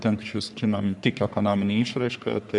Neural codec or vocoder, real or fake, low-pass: codec, 44.1 kHz, 3.4 kbps, Pupu-Codec; fake; 10.8 kHz